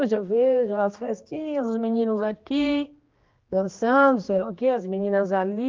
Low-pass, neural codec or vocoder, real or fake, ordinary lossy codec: 7.2 kHz; codec, 16 kHz, 2 kbps, X-Codec, HuBERT features, trained on general audio; fake; Opus, 32 kbps